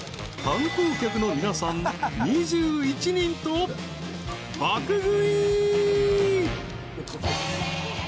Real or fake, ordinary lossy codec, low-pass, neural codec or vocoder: real; none; none; none